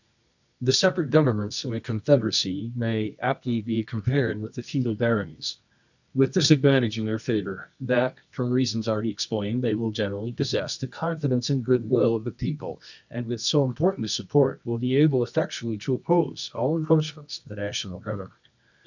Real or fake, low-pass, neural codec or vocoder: fake; 7.2 kHz; codec, 24 kHz, 0.9 kbps, WavTokenizer, medium music audio release